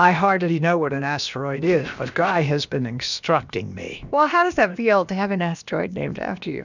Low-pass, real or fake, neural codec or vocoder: 7.2 kHz; fake; codec, 16 kHz, about 1 kbps, DyCAST, with the encoder's durations